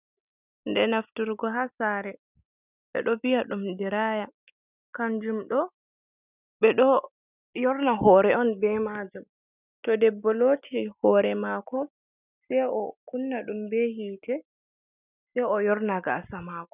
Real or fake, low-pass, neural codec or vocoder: real; 3.6 kHz; none